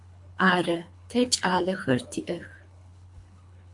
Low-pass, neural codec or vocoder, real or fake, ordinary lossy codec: 10.8 kHz; codec, 24 kHz, 3 kbps, HILCodec; fake; MP3, 64 kbps